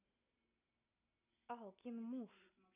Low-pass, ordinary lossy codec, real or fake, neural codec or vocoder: 3.6 kHz; none; real; none